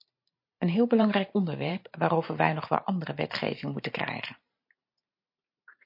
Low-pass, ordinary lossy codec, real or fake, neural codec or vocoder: 5.4 kHz; MP3, 32 kbps; fake; vocoder, 44.1 kHz, 128 mel bands every 512 samples, BigVGAN v2